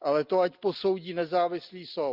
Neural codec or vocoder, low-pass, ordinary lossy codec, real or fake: none; 5.4 kHz; Opus, 32 kbps; real